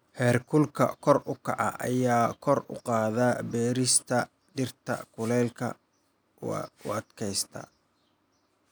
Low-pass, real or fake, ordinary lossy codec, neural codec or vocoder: none; real; none; none